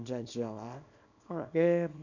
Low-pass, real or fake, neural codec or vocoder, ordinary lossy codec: 7.2 kHz; fake; codec, 24 kHz, 0.9 kbps, WavTokenizer, small release; none